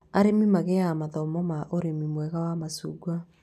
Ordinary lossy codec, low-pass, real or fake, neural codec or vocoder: none; 14.4 kHz; real; none